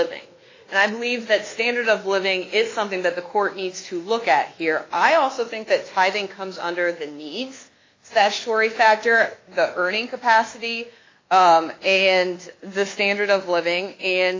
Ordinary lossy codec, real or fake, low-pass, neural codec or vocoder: AAC, 32 kbps; fake; 7.2 kHz; codec, 24 kHz, 1.2 kbps, DualCodec